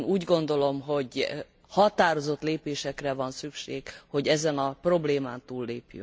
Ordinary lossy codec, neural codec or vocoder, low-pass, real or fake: none; none; none; real